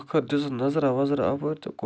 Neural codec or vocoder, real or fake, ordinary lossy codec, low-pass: none; real; none; none